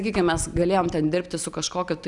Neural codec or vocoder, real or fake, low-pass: none; real; 10.8 kHz